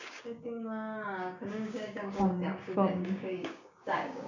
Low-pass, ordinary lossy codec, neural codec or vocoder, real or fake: 7.2 kHz; none; codec, 16 kHz, 6 kbps, DAC; fake